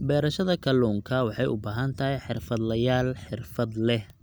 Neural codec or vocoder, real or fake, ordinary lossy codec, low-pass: none; real; none; none